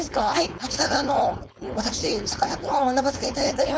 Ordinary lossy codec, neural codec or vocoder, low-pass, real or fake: none; codec, 16 kHz, 4.8 kbps, FACodec; none; fake